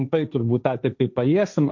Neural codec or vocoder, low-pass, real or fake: codec, 16 kHz, 1.1 kbps, Voila-Tokenizer; 7.2 kHz; fake